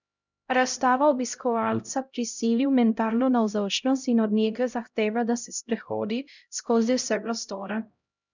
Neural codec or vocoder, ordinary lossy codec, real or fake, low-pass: codec, 16 kHz, 0.5 kbps, X-Codec, HuBERT features, trained on LibriSpeech; none; fake; 7.2 kHz